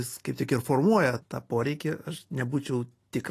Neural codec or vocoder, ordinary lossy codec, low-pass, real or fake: none; AAC, 48 kbps; 14.4 kHz; real